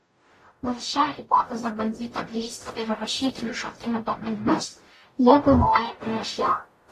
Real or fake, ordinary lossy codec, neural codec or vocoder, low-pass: fake; AAC, 48 kbps; codec, 44.1 kHz, 0.9 kbps, DAC; 14.4 kHz